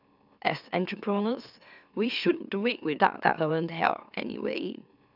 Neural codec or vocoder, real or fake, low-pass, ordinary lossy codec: autoencoder, 44.1 kHz, a latent of 192 numbers a frame, MeloTTS; fake; 5.4 kHz; none